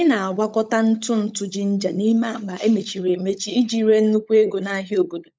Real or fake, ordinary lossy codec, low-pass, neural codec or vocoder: fake; none; none; codec, 16 kHz, 16 kbps, FunCodec, trained on LibriTTS, 50 frames a second